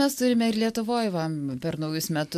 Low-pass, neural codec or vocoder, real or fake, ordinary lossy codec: 14.4 kHz; none; real; AAC, 96 kbps